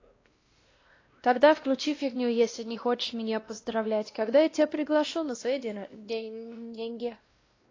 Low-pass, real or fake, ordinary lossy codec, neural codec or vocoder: 7.2 kHz; fake; AAC, 32 kbps; codec, 16 kHz, 1 kbps, X-Codec, WavLM features, trained on Multilingual LibriSpeech